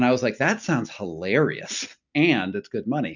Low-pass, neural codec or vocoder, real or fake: 7.2 kHz; none; real